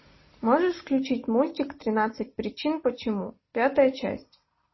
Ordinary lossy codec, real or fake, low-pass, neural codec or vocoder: MP3, 24 kbps; real; 7.2 kHz; none